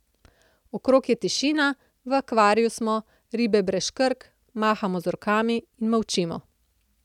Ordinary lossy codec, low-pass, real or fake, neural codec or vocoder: none; 19.8 kHz; real; none